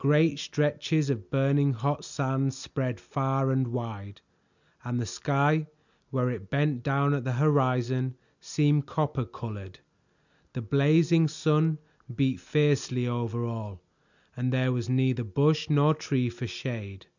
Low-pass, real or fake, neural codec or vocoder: 7.2 kHz; real; none